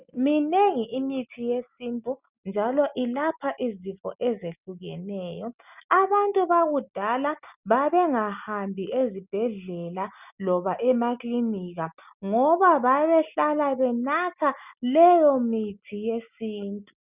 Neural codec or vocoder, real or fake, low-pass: none; real; 3.6 kHz